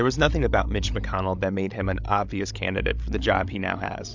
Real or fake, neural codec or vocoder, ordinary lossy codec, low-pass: fake; codec, 16 kHz, 16 kbps, FreqCodec, larger model; MP3, 64 kbps; 7.2 kHz